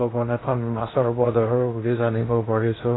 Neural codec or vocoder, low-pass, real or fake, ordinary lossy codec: codec, 16 kHz in and 24 kHz out, 0.6 kbps, FocalCodec, streaming, 2048 codes; 7.2 kHz; fake; AAC, 16 kbps